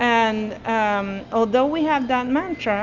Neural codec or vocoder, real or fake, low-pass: none; real; 7.2 kHz